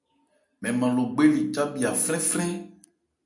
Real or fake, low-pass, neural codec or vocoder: real; 10.8 kHz; none